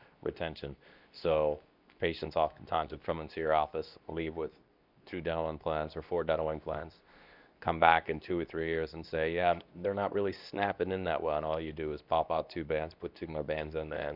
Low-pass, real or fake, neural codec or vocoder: 5.4 kHz; fake; codec, 24 kHz, 0.9 kbps, WavTokenizer, medium speech release version 2